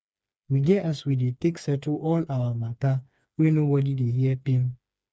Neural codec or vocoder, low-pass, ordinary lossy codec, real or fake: codec, 16 kHz, 4 kbps, FreqCodec, smaller model; none; none; fake